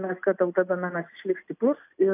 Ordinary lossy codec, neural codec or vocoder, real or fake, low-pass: AAC, 32 kbps; none; real; 3.6 kHz